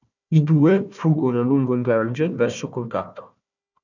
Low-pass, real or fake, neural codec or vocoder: 7.2 kHz; fake; codec, 16 kHz, 1 kbps, FunCodec, trained on Chinese and English, 50 frames a second